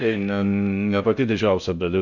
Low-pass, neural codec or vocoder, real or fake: 7.2 kHz; codec, 16 kHz in and 24 kHz out, 0.6 kbps, FocalCodec, streaming, 4096 codes; fake